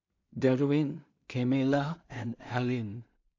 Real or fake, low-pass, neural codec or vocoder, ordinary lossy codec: fake; 7.2 kHz; codec, 16 kHz in and 24 kHz out, 0.4 kbps, LongCat-Audio-Codec, two codebook decoder; MP3, 48 kbps